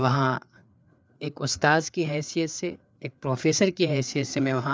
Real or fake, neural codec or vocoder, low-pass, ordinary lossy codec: fake; codec, 16 kHz, 4 kbps, FreqCodec, larger model; none; none